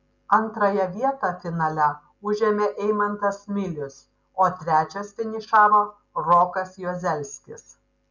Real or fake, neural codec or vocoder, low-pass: real; none; 7.2 kHz